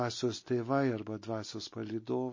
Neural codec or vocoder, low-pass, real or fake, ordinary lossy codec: none; 7.2 kHz; real; MP3, 32 kbps